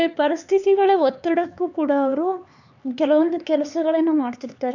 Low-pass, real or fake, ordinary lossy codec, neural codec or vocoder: 7.2 kHz; fake; none; codec, 16 kHz, 4 kbps, X-Codec, HuBERT features, trained on LibriSpeech